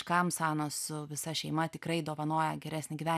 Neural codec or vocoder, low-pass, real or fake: none; 14.4 kHz; real